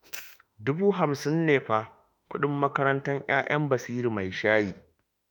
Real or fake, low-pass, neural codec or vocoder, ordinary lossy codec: fake; 19.8 kHz; autoencoder, 48 kHz, 32 numbers a frame, DAC-VAE, trained on Japanese speech; none